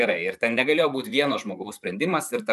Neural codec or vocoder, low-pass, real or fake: vocoder, 44.1 kHz, 128 mel bands, Pupu-Vocoder; 14.4 kHz; fake